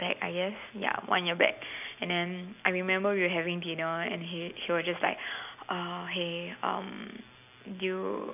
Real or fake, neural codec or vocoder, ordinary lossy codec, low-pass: real; none; none; 3.6 kHz